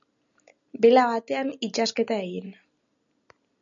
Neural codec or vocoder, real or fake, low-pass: none; real; 7.2 kHz